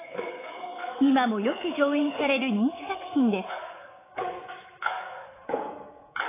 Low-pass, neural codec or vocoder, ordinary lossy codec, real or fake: 3.6 kHz; codec, 24 kHz, 3.1 kbps, DualCodec; AAC, 16 kbps; fake